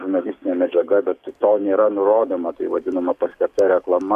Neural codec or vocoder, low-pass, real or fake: codec, 44.1 kHz, 7.8 kbps, DAC; 14.4 kHz; fake